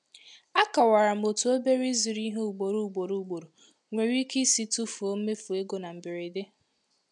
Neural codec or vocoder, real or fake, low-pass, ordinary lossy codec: none; real; 10.8 kHz; none